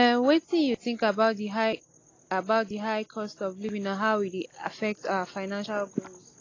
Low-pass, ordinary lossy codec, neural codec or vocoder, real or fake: 7.2 kHz; AAC, 32 kbps; none; real